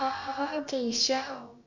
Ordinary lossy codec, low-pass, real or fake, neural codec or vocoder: none; 7.2 kHz; fake; codec, 16 kHz, about 1 kbps, DyCAST, with the encoder's durations